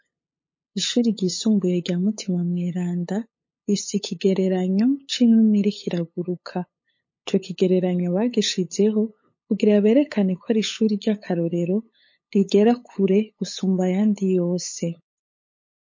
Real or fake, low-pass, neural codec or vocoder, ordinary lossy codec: fake; 7.2 kHz; codec, 16 kHz, 8 kbps, FunCodec, trained on LibriTTS, 25 frames a second; MP3, 32 kbps